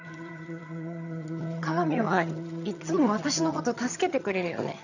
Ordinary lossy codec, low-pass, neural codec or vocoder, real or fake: none; 7.2 kHz; vocoder, 22.05 kHz, 80 mel bands, HiFi-GAN; fake